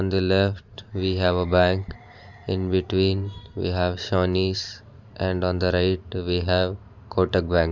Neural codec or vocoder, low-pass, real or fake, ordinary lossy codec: vocoder, 44.1 kHz, 128 mel bands every 512 samples, BigVGAN v2; 7.2 kHz; fake; none